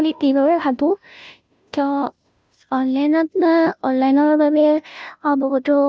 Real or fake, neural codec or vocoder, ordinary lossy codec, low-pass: fake; codec, 16 kHz, 0.5 kbps, FunCodec, trained on Chinese and English, 25 frames a second; none; none